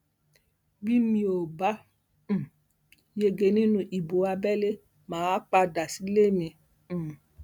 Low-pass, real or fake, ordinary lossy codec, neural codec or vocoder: 19.8 kHz; real; none; none